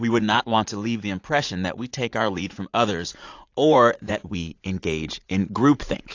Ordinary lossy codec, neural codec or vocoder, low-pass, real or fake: AAC, 48 kbps; vocoder, 44.1 kHz, 80 mel bands, Vocos; 7.2 kHz; fake